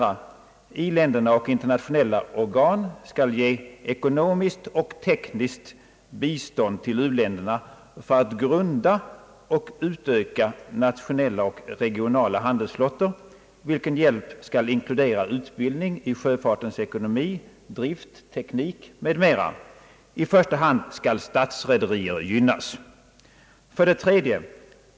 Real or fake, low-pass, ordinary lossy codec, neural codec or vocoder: real; none; none; none